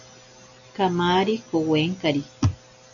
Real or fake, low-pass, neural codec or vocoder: real; 7.2 kHz; none